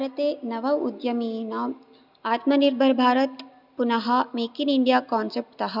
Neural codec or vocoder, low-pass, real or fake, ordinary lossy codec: none; 5.4 kHz; real; none